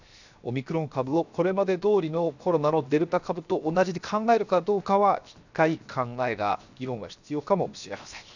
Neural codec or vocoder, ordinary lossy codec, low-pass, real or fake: codec, 16 kHz, 0.7 kbps, FocalCodec; none; 7.2 kHz; fake